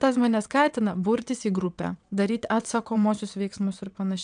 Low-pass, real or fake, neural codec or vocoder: 9.9 kHz; fake; vocoder, 22.05 kHz, 80 mel bands, WaveNeXt